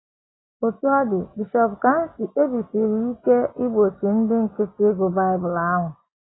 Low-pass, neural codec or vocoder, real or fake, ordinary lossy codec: 7.2 kHz; none; real; AAC, 16 kbps